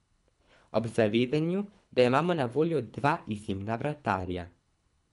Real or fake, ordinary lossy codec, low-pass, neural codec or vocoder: fake; none; 10.8 kHz; codec, 24 kHz, 3 kbps, HILCodec